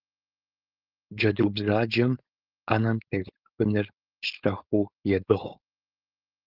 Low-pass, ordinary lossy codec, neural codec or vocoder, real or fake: 5.4 kHz; Opus, 32 kbps; codec, 16 kHz, 4.8 kbps, FACodec; fake